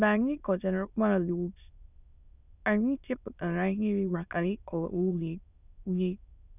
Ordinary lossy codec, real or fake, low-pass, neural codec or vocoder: none; fake; 3.6 kHz; autoencoder, 22.05 kHz, a latent of 192 numbers a frame, VITS, trained on many speakers